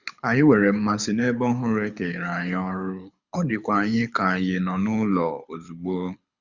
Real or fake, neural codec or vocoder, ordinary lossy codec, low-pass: fake; codec, 24 kHz, 6 kbps, HILCodec; Opus, 64 kbps; 7.2 kHz